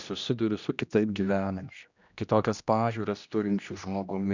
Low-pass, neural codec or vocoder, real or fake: 7.2 kHz; codec, 16 kHz, 1 kbps, X-Codec, HuBERT features, trained on general audio; fake